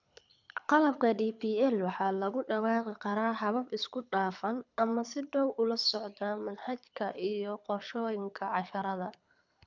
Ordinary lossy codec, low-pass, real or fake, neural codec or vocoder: none; 7.2 kHz; fake; codec, 24 kHz, 6 kbps, HILCodec